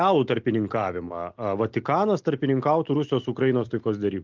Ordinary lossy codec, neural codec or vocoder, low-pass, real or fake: Opus, 32 kbps; none; 7.2 kHz; real